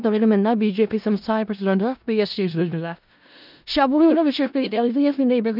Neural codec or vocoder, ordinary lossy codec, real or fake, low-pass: codec, 16 kHz in and 24 kHz out, 0.4 kbps, LongCat-Audio-Codec, four codebook decoder; none; fake; 5.4 kHz